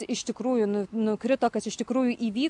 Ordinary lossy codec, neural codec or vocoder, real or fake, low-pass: AAC, 64 kbps; none; real; 10.8 kHz